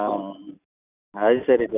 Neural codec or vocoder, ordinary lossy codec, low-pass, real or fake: none; none; 3.6 kHz; real